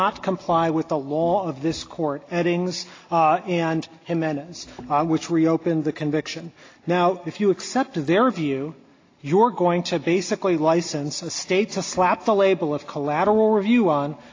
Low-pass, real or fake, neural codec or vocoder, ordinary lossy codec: 7.2 kHz; real; none; AAC, 32 kbps